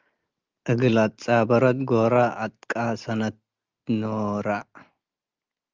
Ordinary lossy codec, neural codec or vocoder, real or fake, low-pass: Opus, 32 kbps; none; real; 7.2 kHz